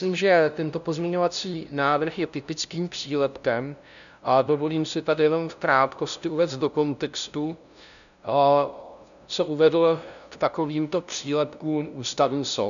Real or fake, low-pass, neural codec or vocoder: fake; 7.2 kHz; codec, 16 kHz, 0.5 kbps, FunCodec, trained on LibriTTS, 25 frames a second